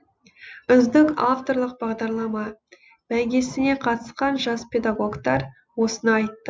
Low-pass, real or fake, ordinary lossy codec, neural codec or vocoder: none; real; none; none